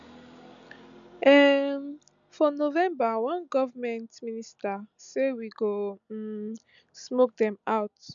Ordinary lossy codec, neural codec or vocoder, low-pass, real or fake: none; none; 7.2 kHz; real